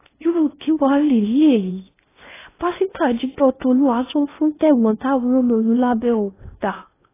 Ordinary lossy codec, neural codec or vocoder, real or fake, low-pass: AAC, 16 kbps; codec, 16 kHz in and 24 kHz out, 0.6 kbps, FocalCodec, streaming, 4096 codes; fake; 3.6 kHz